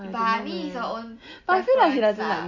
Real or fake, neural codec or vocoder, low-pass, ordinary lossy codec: real; none; 7.2 kHz; MP3, 48 kbps